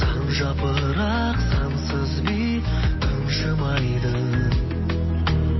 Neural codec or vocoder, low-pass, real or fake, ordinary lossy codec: none; 7.2 kHz; real; MP3, 24 kbps